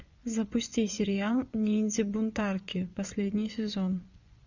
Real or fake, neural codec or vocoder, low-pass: fake; vocoder, 24 kHz, 100 mel bands, Vocos; 7.2 kHz